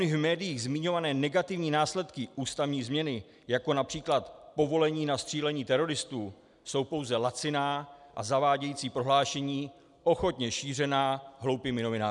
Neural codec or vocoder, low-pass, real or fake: none; 10.8 kHz; real